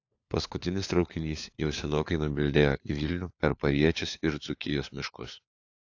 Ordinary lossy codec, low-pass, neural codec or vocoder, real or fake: AAC, 48 kbps; 7.2 kHz; codec, 16 kHz, 4 kbps, FunCodec, trained on LibriTTS, 50 frames a second; fake